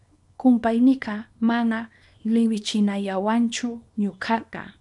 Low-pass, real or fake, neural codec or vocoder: 10.8 kHz; fake; codec, 24 kHz, 0.9 kbps, WavTokenizer, small release